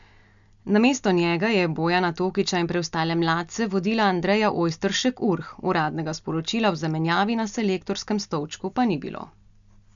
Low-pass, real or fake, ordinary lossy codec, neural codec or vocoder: 7.2 kHz; real; MP3, 96 kbps; none